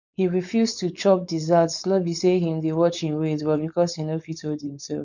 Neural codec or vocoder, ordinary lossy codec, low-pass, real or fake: codec, 16 kHz, 4.8 kbps, FACodec; none; 7.2 kHz; fake